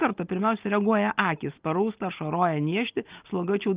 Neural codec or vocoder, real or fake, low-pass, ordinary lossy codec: none; real; 3.6 kHz; Opus, 32 kbps